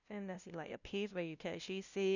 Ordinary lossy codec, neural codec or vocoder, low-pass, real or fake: none; codec, 16 kHz, 0.5 kbps, FunCodec, trained on LibriTTS, 25 frames a second; 7.2 kHz; fake